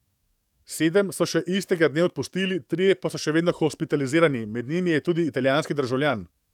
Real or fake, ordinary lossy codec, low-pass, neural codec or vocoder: fake; none; 19.8 kHz; codec, 44.1 kHz, 7.8 kbps, DAC